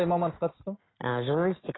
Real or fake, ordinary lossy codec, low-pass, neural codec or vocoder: real; AAC, 16 kbps; 7.2 kHz; none